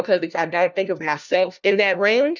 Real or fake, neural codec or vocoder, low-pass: fake; codec, 16 kHz, 1 kbps, FunCodec, trained on LibriTTS, 50 frames a second; 7.2 kHz